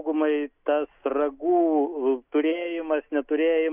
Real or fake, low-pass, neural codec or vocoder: real; 3.6 kHz; none